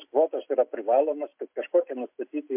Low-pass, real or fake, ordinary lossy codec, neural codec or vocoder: 3.6 kHz; real; MP3, 32 kbps; none